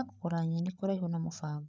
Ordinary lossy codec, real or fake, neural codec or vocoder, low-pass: none; fake; codec, 16 kHz, 8 kbps, FreqCodec, larger model; none